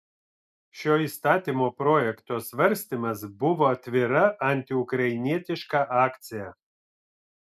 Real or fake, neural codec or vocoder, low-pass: real; none; 14.4 kHz